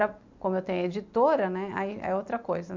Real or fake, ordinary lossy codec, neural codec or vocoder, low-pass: real; MP3, 64 kbps; none; 7.2 kHz